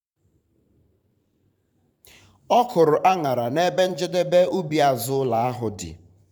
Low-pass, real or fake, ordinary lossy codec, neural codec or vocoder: 19.8 kHz; fake; none; vocoder, 44.1 kHz, 128 mel bands every 512 samples, BigVGAN v2